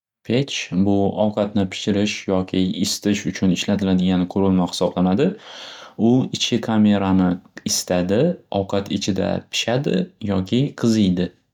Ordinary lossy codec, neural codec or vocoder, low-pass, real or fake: none; none; 19.8 kHz; real